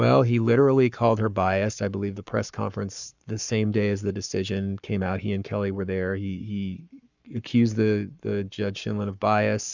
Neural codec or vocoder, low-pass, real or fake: codec, 44.1 kHz, 7.8 kbps, Pupu-Codec; 7.2 kHz; fake